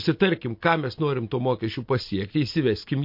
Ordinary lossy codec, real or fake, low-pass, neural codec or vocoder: MP3, 32 kbps; real; 5.4 kHz; none